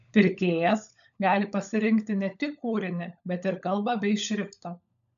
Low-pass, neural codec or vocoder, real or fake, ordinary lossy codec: 7.2 kHz; codec, 16 kHz, 16 kbps, FunCodec, trained on LibriTTS, 50 frames a second; fake; MP3, 96 kbps